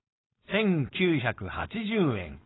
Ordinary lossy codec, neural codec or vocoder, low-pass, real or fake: AAC, 16 kbps; codec, 16 kHz, 4.8 kbps, FACodec; 7.2 kHz; fake